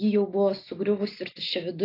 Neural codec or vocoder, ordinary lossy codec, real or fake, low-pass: none; AAC, 32 kbps; real; 5.4 kHz